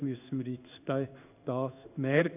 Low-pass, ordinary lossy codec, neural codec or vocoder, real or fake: 3.6 kHz; none; codec, 16 kHz in and 24 kHz out, 1 kbps, XY-Tokenizer; fake